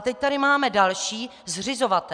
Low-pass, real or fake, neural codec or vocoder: 9.9 kHz; real; none